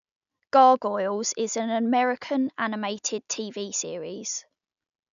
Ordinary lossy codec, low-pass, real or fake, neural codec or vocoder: none; 7.2 kHz; real; none